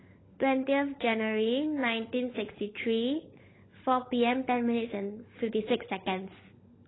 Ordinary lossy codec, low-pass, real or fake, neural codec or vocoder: AAC, 16 kbps; 7.2 kHz; fake; codec, 16 kHz, 16 kbps, FunCodec, trained on LibriTTS, 50 frames a second